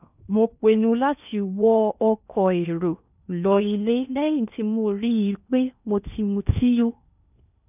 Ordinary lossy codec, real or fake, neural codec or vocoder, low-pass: none; fake; codec, 16 kHz in and 24 kHz out, 0.8 kbps, FocalCodec, streaming, 65536 codes; 3.6 kHz